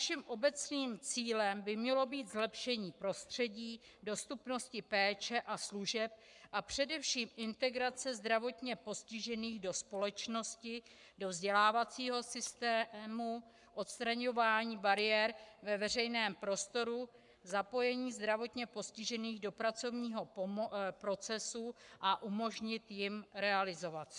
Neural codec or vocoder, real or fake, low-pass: codec, 44.1 kHz, 7.8 kbps, Pupu-Codec; fake; 10.8 kHz